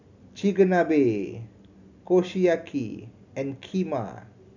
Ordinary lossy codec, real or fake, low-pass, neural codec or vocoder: none; real; 7.2 kHz; none